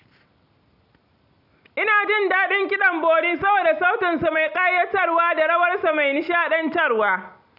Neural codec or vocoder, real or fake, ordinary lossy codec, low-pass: none; real; none; 5.4 kHz